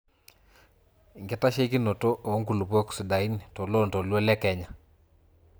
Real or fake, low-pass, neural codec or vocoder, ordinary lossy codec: real; none; none; none